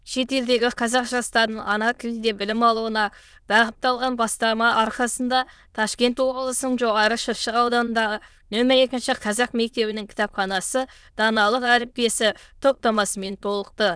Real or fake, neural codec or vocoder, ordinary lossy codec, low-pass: fake; autoencoder, 22.05 kHz, a latent of 192 numbers a frame, VITS, trained on many speakers; none; none